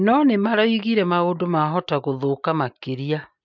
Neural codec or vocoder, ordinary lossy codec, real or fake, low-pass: none; MP3, 64 kbps; real; 7.2 kHz